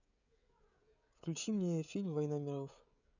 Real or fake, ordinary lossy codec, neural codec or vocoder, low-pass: fake; none; codec, 16 kHz, 16 kbps, FreqCodec, smaller model; 7.2 kHz